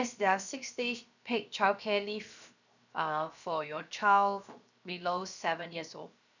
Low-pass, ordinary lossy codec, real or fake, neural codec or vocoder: 7.2 kHz; none; fake; codec, 16 kHz, 0.7 kbps, FocalCodec